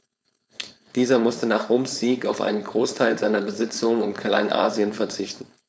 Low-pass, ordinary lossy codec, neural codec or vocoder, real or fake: none; none; codec, 16 kHz, 4.8 kbps, FACodec; fake